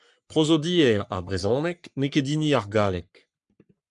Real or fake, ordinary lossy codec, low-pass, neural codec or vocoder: fake; MP3, 96 kbps; 10.8 kHz; codec, 44.1 kHz, 3.4 kbps, Pupu-Codec